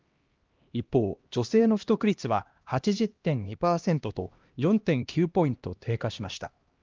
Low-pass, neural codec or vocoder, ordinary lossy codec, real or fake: 7.2 kHz; codec, 16 kHz, 1 kbps, X-Codec, HuBERT features, trained on LibriSpeech; Opus, 32 kbps; fake